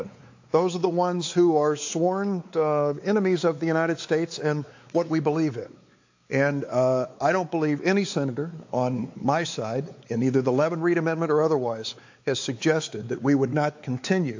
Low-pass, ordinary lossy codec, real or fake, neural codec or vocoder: 7.2 kHz; AAC, 48 kbps; fake; codec, 16 kHz, 4 kbps, X-Codec, WavLM features, trained on Multilingual LibriSpeech